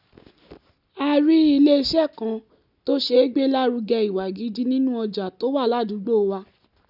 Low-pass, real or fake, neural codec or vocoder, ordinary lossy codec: 5.4 kHz; real; none; none